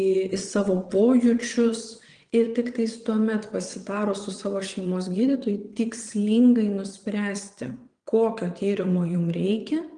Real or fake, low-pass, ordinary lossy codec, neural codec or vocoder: fake; 9.9 kHz; Opus, 24 kbps; vocoder, 22.05 kHz, 80 mel bands, WaveNeXt